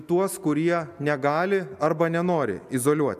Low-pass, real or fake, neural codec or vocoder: 14.4 kHz; real; none